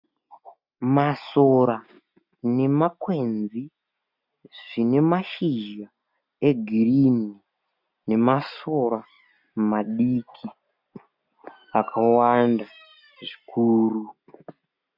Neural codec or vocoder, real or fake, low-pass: none; real; 5.4 kHz